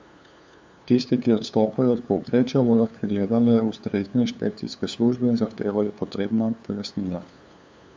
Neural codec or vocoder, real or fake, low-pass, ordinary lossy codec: codec, 16 kHz, 2 kbps, FunCodec, trained on LibriTTS, 25 frames a second; fake; none; none